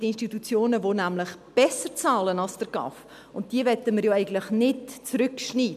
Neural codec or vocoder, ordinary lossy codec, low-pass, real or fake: none; none; 14.4 kHz; real